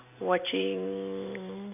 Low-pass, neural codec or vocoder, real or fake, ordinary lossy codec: 3.6 kHz; none; real; none